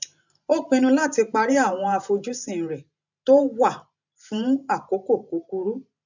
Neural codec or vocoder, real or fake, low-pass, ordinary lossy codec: vocoder, 44.1 kHz, 128 mel bands every 512 samples, BigVGAN v2; fake; 7.2 kHz; none